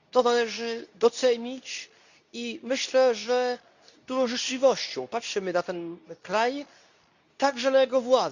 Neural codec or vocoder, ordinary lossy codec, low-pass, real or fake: codec, 24 kHz, 0.9 kbps, WavTokenizer, medium speech release version 2; none; 7.2 kHz; fake